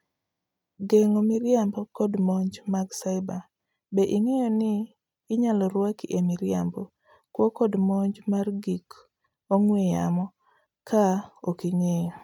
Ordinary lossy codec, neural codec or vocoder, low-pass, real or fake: none; none; 19.8 kHz; real